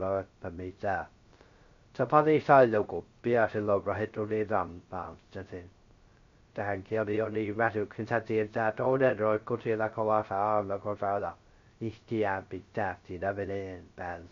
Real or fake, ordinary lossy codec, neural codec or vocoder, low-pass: fake; MP3, 48 kbps; codec, 16 kHz, 0.3 kbps, FocalCodec; 7.2 kHz